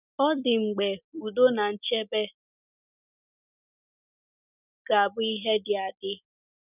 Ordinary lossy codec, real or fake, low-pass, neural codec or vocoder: none; real; 3.6 kHz; none